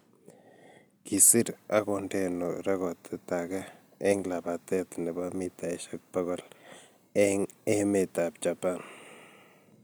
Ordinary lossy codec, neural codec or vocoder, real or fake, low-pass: none; none; real; none